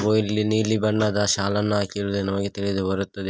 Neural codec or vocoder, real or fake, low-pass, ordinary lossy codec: none; real; none; none